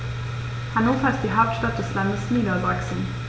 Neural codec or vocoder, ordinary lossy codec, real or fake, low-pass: none; none; real; none